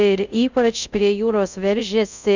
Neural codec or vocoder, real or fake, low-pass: codec, 24 kHz, 0.5 kbps, DualCodec; fake; 7.2 kHz